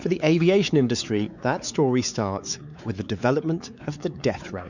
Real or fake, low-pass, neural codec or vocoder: fake; 7.2 kHz; codec, 16 kHz, 4 kbps, X-Codec, WavLM features, trained on Multilingual LibriSpeech